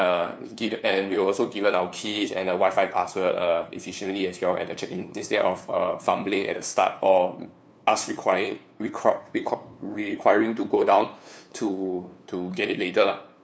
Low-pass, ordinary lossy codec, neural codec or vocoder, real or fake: none; none; codec, 16 kHz, 2 kbps, FunCodec, trained on LibriTTS, 25 frames a second; fake